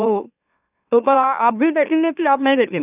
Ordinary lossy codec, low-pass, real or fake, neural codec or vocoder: none; 3.6 kHz; fake; autoencoder, 44.1 kHz, a latent of 192 numbers a frame, MeloTTS